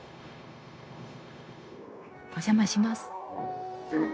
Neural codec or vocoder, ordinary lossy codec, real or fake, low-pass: codec, 16 kHz, 0.9 kbps, LongCat-Audio-Codec; none; fake; none